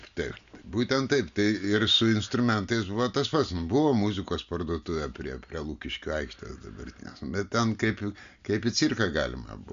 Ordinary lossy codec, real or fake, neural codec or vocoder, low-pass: MP3, 64 kbps; real; none; 7.2 kHz